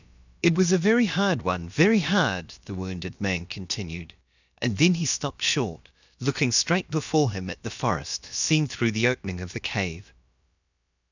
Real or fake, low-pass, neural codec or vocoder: fake; 7.2 kHz; codec, 16 kHz, about 1 kbps, DyCAST, with the encoder's durations